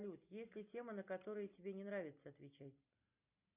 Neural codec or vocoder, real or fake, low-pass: none; real; 3.6 kHz